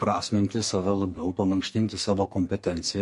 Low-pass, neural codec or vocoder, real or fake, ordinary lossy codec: 14.4 kHz; codec, 44.1 kHz, 2.6 kbps, SNAC; fake; MP3, 48 kbps